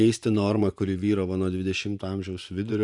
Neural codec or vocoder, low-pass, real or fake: vocoder, 24 kHz, 100 mel bands, Vocos; 10.8 kHz; fake